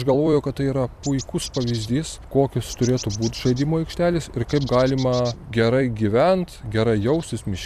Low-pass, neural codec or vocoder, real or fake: 14.4 kHz; vocoder, 44.1 kHz, 128 mel bands every 256 samples, BigVGAN v2; fake